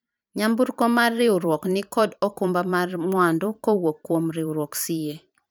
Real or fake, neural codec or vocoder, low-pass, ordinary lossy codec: fake; vocoder, 44.1 kHz, 128 mel bands every 512 samples, BigVGAN v2; none; none